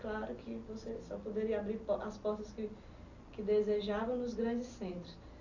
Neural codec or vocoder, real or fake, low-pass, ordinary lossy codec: none; real; 7.2 kHz; none